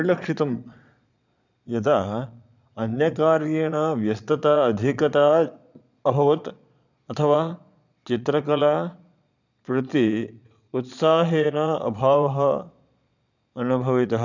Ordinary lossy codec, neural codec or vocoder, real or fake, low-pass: none; vocoder, 22.05 kHz, 80 mel bands, Vocos; fake; 7.2 kHz